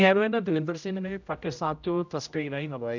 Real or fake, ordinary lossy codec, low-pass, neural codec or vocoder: fake; none; 7.2 kHz; codec, 16 kHz, 0.5 kbps, X-Codec, HuBERT features, trained on general audio